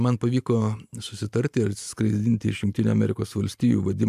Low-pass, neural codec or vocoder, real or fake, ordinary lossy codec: 14.4 kHz; vocoder, 44.1 kHz, 128 mel bands every 512 samples, BigVGAN v2; fake; AAC, 96 kbps